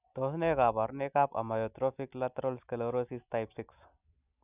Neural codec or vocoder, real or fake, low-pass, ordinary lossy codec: none; real; 3.6 kHz; none